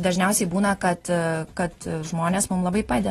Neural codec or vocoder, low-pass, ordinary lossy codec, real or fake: none; 19.8 kHz; AAC, 32 kbps; real